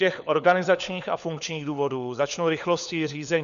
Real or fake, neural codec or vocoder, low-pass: fake; codec, 16 kHz, 4 kbps, FunCodec, trained on LibriTTS, 50 frames a second; 7.2 kHz